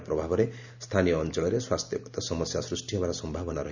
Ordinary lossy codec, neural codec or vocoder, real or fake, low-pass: none; none; real; 7.2 kHz